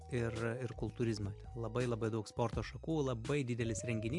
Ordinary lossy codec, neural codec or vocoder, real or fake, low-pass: MP3, 64 kbps; none; real; 10.8 kHz